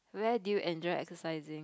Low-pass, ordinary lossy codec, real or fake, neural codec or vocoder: none; none; real; none